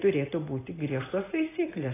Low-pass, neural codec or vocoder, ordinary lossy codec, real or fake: 3.6 kHz; none; AAC, 16 kbps; real